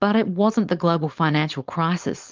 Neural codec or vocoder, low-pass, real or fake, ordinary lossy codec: codec, 16 kHz, 4.8 kbps, FACodec; 7.2 kHz; fake; Opus, 24 kbps